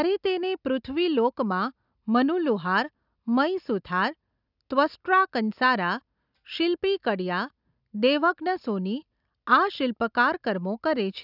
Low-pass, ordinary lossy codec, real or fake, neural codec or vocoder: 5.4 kHz; none; real; none